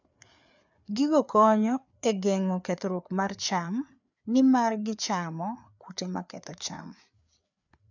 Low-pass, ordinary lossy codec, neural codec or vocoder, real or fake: 7.2 kHz; none; codec, 16 kHz, 4 kbps, FreqCodec, larger model; fake